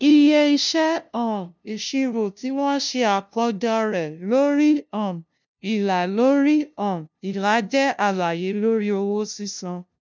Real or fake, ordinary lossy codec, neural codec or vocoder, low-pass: fake; none; codec, 16 kHz, 0.5 kbps, FunCodec, trained on LibriTTS, 25 frames a second; none